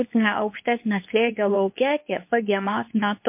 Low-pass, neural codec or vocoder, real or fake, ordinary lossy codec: 3.6 kHz; codec, 24 kHz, 0.9 kbps, WavTokenizer, medium speech release version 2; fake; MP3, 32 kbps